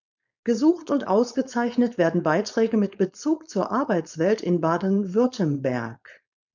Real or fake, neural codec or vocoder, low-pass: fake; codec, 16 kHz, 4.8 kbps, FACodec; 7.2 kHz